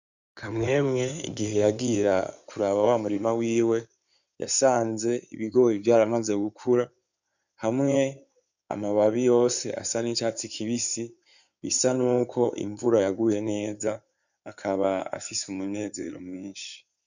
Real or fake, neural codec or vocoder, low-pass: fake; codec, 16 kHz in and 24 kHz out, 2.2 kbps, FireRedTTS-2 codec; 7.2 kHz